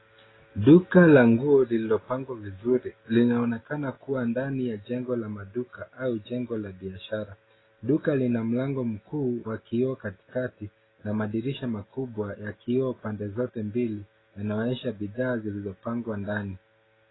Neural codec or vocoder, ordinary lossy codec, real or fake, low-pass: none; AAC, 16 kbps; real; 7.2 kHz